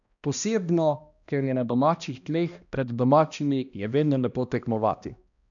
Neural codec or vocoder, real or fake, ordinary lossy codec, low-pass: codec, 16 kHz, 1 kbps, X-Codec, HuBERT features, trained on balanced general audio; fake; none; 7.2 kHz